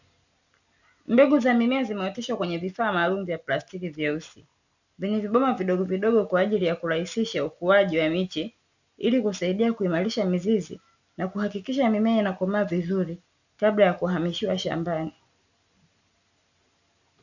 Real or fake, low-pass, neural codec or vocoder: real; 7.2 kHz; none